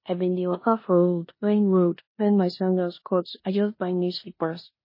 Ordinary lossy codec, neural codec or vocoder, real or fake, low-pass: MP3, 24 kbps; codec, 16 kHz in and 24 kHz out, 0.9 kbps, LongCat-Audio-Codec, four codebook decoder; fake; 5.4 kHz